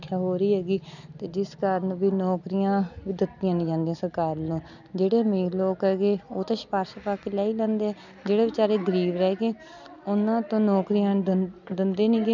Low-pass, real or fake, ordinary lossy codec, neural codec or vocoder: 7.2 kHz; real; none; none